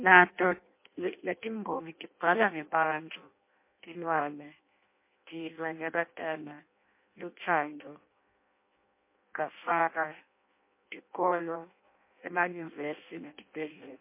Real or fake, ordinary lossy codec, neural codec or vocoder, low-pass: fake; MP3, 24 kbps; codec, 16 kHz in and 24 kHz out, 0.6 kbps, FireRedTTS-2 codec; 3.6 kHz